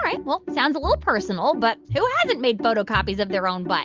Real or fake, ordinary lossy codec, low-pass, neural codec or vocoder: real; Opus, 24 kbps; 7.2 kHz; none